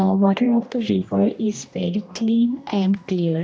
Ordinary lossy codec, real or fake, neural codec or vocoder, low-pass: none; fake; codec, 16 kHz, 1 kbps, X-Codec, HuBERT features, trained on general audio; none